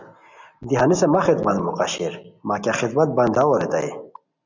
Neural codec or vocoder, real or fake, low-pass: none; real; 7.2 kHz